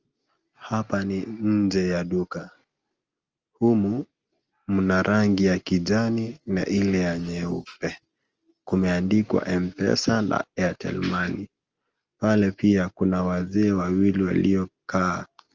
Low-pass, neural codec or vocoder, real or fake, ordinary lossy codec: 7.2 kHz; none; real; Opus, 24 kbps